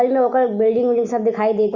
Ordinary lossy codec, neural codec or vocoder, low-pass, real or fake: Opus, 64 kbps; none; 7.2 kHz; real